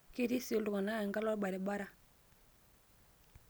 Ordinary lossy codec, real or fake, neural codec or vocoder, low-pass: none; real; none; none